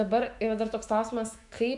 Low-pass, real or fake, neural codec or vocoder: 10.8 kHz; fake; autoencoder, 48 kHz, 128 numbers a frame, DAC-VAE, trained on Japanese speech